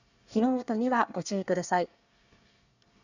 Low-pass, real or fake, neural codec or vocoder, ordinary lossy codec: 7.2 kHz; fake; codec, 24 kHz, 1 kbps, SNAC; none